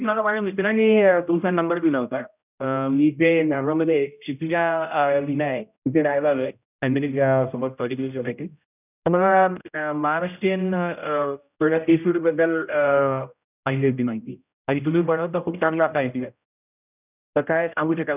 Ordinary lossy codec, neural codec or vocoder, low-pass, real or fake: none; codec, 16 kHz, 0.5 kbps, X-Codec, HuBERT features, trained on general audio; 3.6 kHz; fake